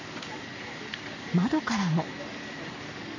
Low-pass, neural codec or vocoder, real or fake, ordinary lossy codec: 7.2 kHz; codec, 44.1 kHz, 7.8 kbps, Pupu-Codec; fake; none